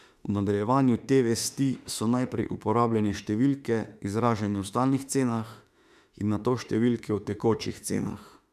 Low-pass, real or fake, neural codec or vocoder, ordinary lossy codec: 14.4 kHz; fake; autoencoder, 48 kHz, 32 numbers a frame, DAC-VAE, trained on Japanese speech; none